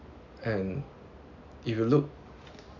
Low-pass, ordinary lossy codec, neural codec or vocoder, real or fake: 7.2 kHz; none; none; real